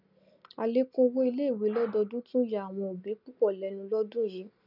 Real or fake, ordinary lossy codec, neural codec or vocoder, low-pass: real; none; none; 5.4 kHz